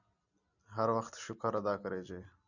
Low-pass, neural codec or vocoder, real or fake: 7.2 kHz; none; real